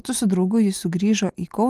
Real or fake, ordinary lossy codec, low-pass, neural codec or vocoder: real; Opus, 24 kbps; 14.4 kHz; none